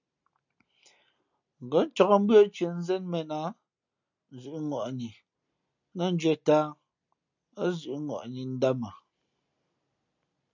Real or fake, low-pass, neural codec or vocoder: real; 7.2 kHz; none